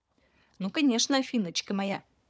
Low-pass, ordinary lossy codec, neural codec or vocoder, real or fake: none; none; codec, 16 kHz, 4 kbps, FunCodec, trained on Chinese and English, 50 frames a second; fake